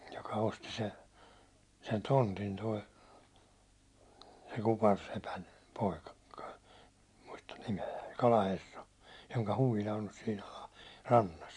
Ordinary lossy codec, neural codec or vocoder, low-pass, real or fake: MP3, 64 kbps; none; 10.8 kHz; real